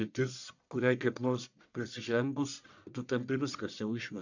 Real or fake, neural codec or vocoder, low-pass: fake; codec, 44.1 kHz, 1.7 kbps, Pupu-Codec; 7.2 kHz